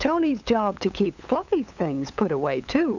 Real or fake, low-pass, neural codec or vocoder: fake; 7.2 kHz; codec, 16 kHz, 8 kbps, FunCodec, trained on LibriTTS, 25 frames a second